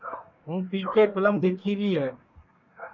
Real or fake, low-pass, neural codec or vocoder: fake; 7.2 kHz; codec, 24 kHz, 1 kbps, SNAC